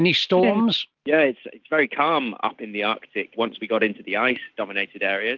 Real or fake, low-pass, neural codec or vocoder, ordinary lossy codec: real; 7.2 kHz; none; Opus, 24 kbps